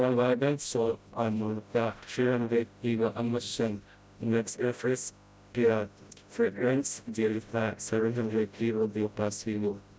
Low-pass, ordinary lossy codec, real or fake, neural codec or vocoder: none; none; fake; codec, 16 kHz, 0.5 kbps, FreqCodec, smaller model